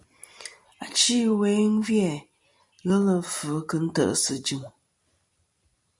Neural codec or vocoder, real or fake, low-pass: vocoder, 44.1 kHz, 128 mel bands every 256 samples, BigVGAN v2; fake; 10.8 kHz